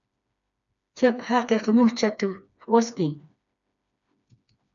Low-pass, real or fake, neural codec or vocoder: 7.2 kHz; fake; codec, 16 kHz, 2 kbps, FreqCodec, smaller model